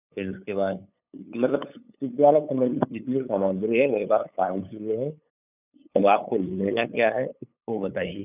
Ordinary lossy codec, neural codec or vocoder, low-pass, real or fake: none; codec, 16 kHz, 8 kbps, FunCodec, trained on LibriTTS, 25 frames a second; 3.6 kHz; fake